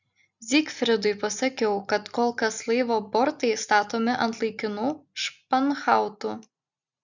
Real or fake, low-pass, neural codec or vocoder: real; 7.2 kHz; none